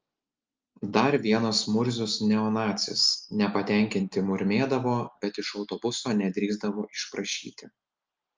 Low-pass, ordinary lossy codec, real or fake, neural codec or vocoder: 7.2 kHz; Opus, 32 kbps; real; none